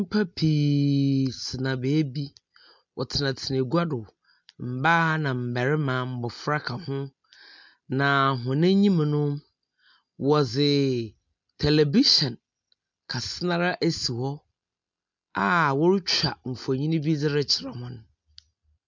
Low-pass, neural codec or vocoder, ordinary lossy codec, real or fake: 7.2 kHz; none; MP3, 64 kbps; real